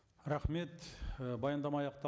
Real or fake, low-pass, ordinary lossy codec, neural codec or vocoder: real; none; none; none